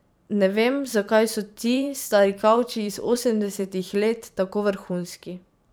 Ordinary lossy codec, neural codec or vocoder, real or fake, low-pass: none; vocoder, 44.1 kHz, 128 mel bands every 512 samples, BigVGAN v2; fake; none